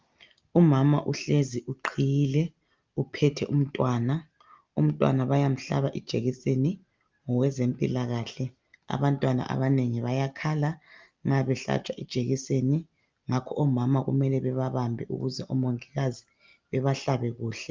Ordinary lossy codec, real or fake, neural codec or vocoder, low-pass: Opus, 24 kbps; real; none; 7.2 kHz